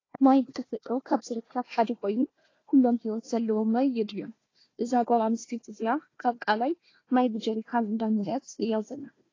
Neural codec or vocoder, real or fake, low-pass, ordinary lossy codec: codec, 16 kHz, 1 kbps, FunCodec, trained on Chinese and English, 50 frames a second; fake; 7.2 kHz; AAC, 32 kbps